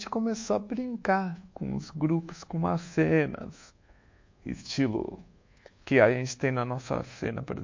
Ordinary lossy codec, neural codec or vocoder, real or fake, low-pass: MP3, 48 kbps; codec, 24 kHz, 1.2 kbps, DualCodec; fake; 7.2 kHz